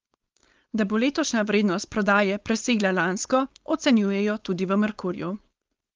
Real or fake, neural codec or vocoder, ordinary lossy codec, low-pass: fake; codec, 16 kHz, 4.8 kbps, FACodec; Opus, 32 kbps; 7.2 kHz